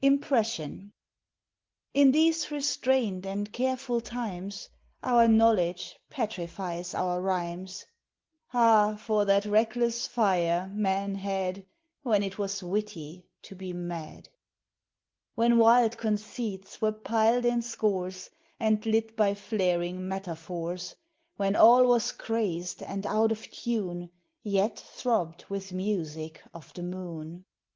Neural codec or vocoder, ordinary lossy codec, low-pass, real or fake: none; Opus, 16 kbps; 7.2 kHz; real